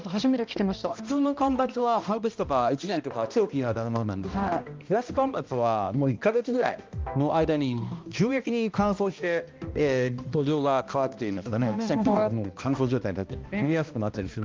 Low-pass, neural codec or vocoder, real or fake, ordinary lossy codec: 7.2 kHz; codec, 16 kHz, 1 kbps, X-Codec, HuBERT features, trained on balanced general audio; fake; Opus, 32 kbps